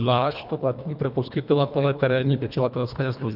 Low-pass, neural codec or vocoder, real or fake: 5.4 kHz; codec, 24 kHz, 1.5 kbps, HILCodec; fake